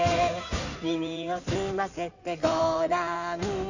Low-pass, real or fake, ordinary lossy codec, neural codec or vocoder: 7.2 kHz; fake; none; codec, 16 kHz in and 24 kHz out, 2.2 kbps, FireRedTTS-2 codec